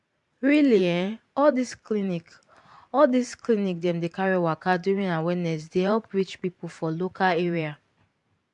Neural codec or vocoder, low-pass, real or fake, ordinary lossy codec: vocoder, 44.1 kHz, 128 mel bands every 512 samples, BigVGAN v2; 10.8 kHz; fake; MP3, 64 kbps